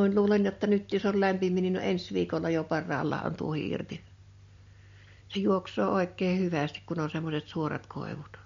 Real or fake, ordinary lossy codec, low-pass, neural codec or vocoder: real; MP3, 48 kbps; 7.2 kHz; none